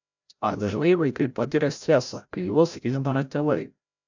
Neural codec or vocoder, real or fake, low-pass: codec, 16 kHz, 0.5 kbps, FreqCodec, larger model; fake; 7.2 kHz